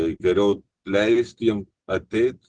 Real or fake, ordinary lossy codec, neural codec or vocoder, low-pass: real; Opus, 16 kbps; none; 9.9 kHz